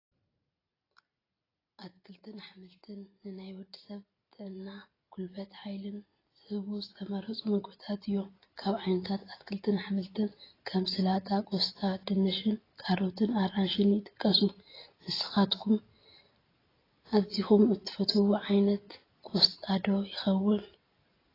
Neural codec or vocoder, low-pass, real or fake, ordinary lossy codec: none; 5.4 kHz; real; AAC, 24 kbps